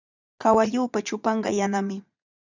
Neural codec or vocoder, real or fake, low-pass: vocoder, 22.05 kHz, 80 mel bands, Vocos; fake; 7.2 kHz